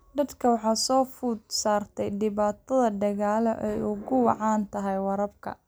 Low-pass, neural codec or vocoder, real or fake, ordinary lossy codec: none; none; real; none